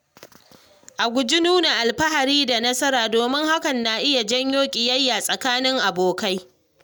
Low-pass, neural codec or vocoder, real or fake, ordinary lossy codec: none; none; real; none